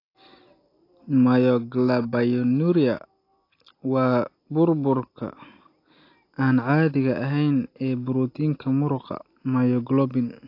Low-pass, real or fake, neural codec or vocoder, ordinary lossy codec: 5.4 kHz; real; none; AAC, 32 kbps